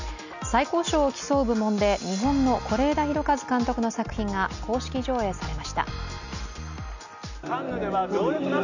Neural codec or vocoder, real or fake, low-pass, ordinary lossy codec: none; real; 7.2 kHz; none